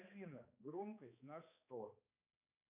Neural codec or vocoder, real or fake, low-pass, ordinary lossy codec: codec, 16 kHz, 2 kbps, X-Codec, HuBERT features, trained on balanced general audio; fake; 3.6 kHz; MP3, 32 kbps